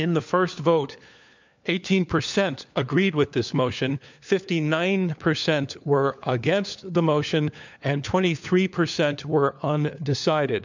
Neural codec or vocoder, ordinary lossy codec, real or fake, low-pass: codec, 16 kHz, 4 kbps, FunCodec, trained on LibriTTS, 50 frames a second; MP3, 64 kbps; fake; 7.2 kHz